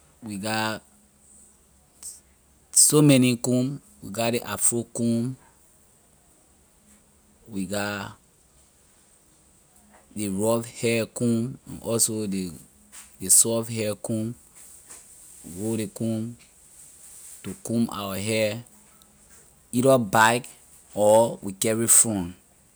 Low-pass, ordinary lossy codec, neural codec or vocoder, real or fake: none; none; none; real